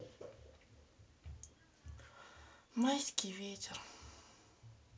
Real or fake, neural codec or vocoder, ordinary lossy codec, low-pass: real; none; none; none